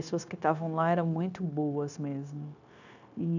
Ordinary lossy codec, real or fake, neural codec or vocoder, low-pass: none; fake; codec, 16 kHz, 0.9 kbps, LongCat-Audio-Codec; 7.2 kHz